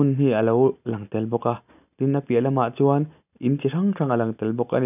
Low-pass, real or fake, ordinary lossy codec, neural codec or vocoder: 3.6 kHz; real; none; none